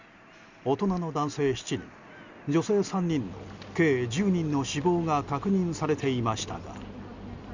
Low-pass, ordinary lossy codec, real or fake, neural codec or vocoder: 7.2 kHz; Opus, 64 kbps; real; none